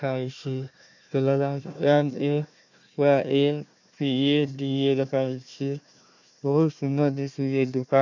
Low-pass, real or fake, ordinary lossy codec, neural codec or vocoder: 7.2 kHz; fake; none; codec, 16 kHz, 1 kbps, FunCodec, trained on Chinese and English, 50 frames a second